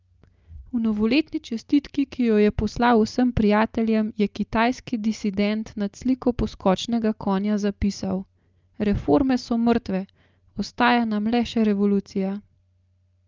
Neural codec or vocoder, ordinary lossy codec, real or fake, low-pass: none; Opus, 24 kbps; real; 7.2 kHz